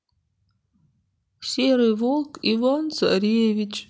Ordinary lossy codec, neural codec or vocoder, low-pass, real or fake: none; none; none; real